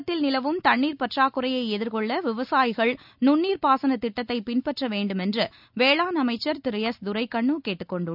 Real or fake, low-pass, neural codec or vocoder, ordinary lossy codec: real; 5.4 kHz; none; none